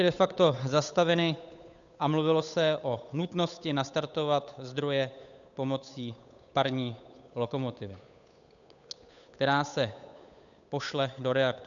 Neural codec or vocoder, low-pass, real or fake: codec, 16 kHz, 8 kbps, FunCodec, trained on Chinese and English, 25 frames a second; 7.2 kHz; fake